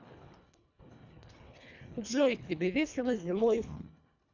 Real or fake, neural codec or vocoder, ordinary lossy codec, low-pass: fake; codec, 24 kHz, 1.5 kbps, HILCodec; none; 7.2 kHz